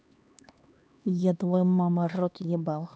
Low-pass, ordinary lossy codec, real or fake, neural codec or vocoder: none; none; fake; codec, 16 kHz, 2 kbps, X-Codec, HuBERT features, trained on LibriSpeech